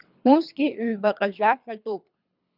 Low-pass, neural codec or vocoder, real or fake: 5.4 kHz; codec, 24 kHz, 3 kbps, HILCodec; fake